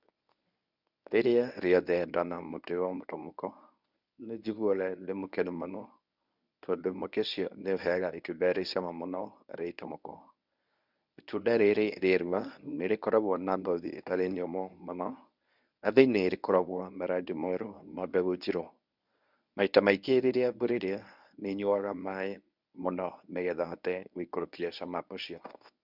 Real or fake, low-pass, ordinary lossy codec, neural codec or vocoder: fake; 5.4 kHz; none; codec, 24 kHz, 0.9 kbps, WavTokenizer, medium speech release version 1